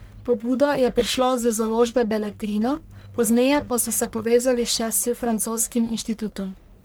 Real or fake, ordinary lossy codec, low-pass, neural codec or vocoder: fake; none; none; codec, 44.1 kHz, 1.7 kbps, Pupu-Codec